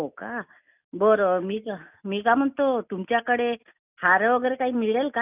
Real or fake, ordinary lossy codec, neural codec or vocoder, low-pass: real; none; none; 3.6 kHz